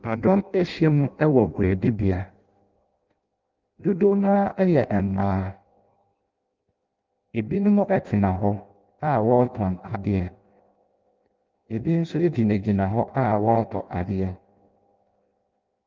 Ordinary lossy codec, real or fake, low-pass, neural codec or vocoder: Opus, 32 kbps; fake; 7.2 kHz; codec, 16 kHz in and 24 kHz out, 0.6 kbps, FireRedTTS-2 codec